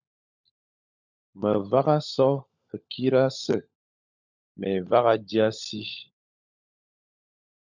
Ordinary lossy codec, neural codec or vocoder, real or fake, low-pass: MP3, 64 kbps; codec, 16 kHz, 16 kbps, FunCodec, trained on LibriTTS, 50 frames a second; fake; 7.2 kHz